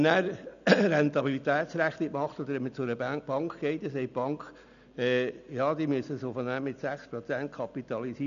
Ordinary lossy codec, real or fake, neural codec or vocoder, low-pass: none; real; none; 7.2 kHz